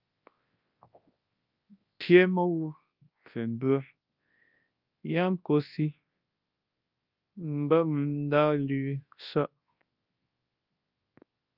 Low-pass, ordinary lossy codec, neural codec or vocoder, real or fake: 5.4 kHz; Opus, 24 kbps; codec, 24 kHz, 0.9 kbps, WavTokenizer, large speech release; fake